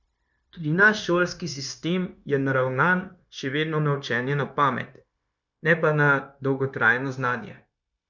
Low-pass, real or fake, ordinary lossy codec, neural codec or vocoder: 7.2 kHz; fake; none; codec, 16 kHz, 0.9 kbps, LongCat-Audio-Codec